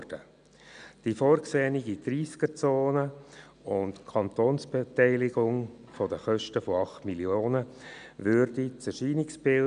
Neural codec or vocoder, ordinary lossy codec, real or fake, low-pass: none; none; real; 9.9 kHz